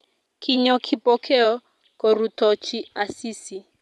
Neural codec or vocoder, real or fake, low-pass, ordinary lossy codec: vocoder, 24 kHz, 100 mel bands, Vocos; fake; none; none